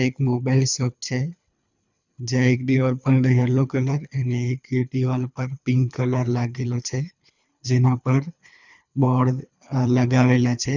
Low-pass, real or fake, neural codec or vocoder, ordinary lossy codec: 7.2 kHz; fake; codec, 24 kHz, 3 kbps, HILCodec; none